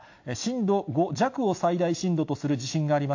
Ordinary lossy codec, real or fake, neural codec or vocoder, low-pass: AAC, 48 kbps; real; none; 7.2 kHz